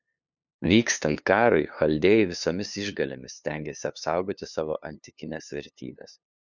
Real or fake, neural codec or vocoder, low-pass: fake; codec, 16 kHz, 2 kbps, FunCodec, trained on LibriTTS, 25 frames a second; 7.2 kHz